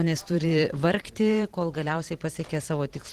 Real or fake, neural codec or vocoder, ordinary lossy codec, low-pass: fake; vocoder, 44.1 kHz, 128 mel bands every 512 samples, BigVGAN v2; Opus, 16 kbps; 14.4 kHz